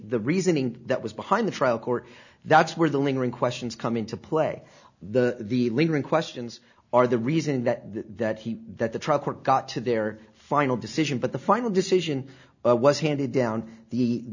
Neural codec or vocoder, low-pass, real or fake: none; 7.2 kHz; real